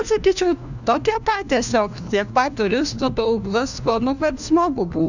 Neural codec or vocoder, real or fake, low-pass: codec, 16 kHz, 1 kbps, FunCodec, trained on LibriTTS, 50 frames a second; fake; 7.2 kHz